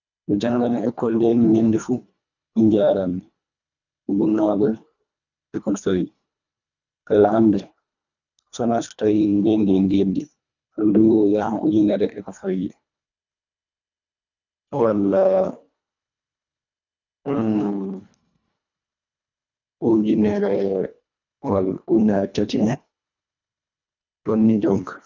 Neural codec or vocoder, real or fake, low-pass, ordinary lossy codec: codec, 24 kHz, 1.5 kbps, HILCodec; fake; 7.2 kHz; none